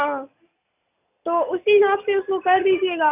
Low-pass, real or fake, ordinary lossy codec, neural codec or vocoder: 3.6 kHz; real; none; none